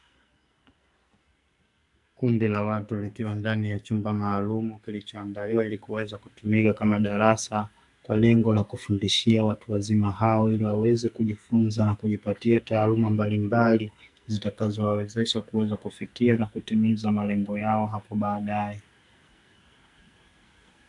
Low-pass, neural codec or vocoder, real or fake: 10.8 kHz; codec, 44.1 kHz, 2.6 kbps, SNAC; fake